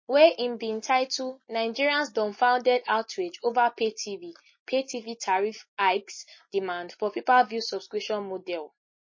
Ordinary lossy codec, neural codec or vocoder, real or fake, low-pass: MP3, 32 kbps; none; real; 7.2 kHz